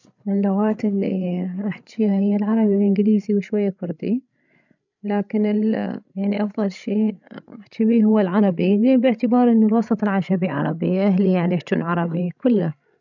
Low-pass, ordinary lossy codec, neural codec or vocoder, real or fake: 7.2 kHz; none; codec, 16 kHz, 16 kbps, FreqCodec, larger model; fake